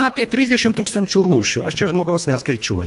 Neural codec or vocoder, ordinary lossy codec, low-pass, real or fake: codec, 24 kHz, 1.5 kbps, HILCodec; AAC, 64 kbps; 10.8 kHz; fake